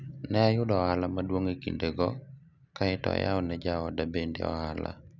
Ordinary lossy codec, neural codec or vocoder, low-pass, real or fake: none; none; 7.2 kHz; real